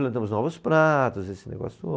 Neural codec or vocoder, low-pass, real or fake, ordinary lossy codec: none; none; real; none